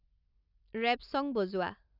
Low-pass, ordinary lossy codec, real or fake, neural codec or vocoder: 5.4 kHz; none; real; none